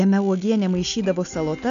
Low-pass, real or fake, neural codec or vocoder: 7.2 kHz; real; none